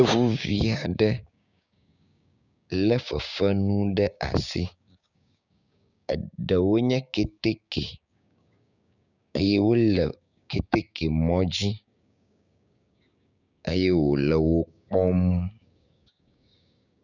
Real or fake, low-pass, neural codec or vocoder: fake; 7.2 kHz; codec, 16 kHz, 6 kbps, DAC